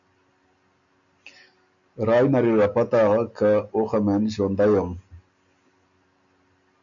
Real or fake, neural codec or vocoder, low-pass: real; none; 7.2 kHz